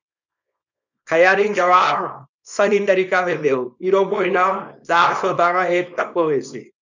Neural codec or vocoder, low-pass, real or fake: codec, 24 kHz, 0.9 kbps, WavTokenizer, small release; 7.2 kHz; fake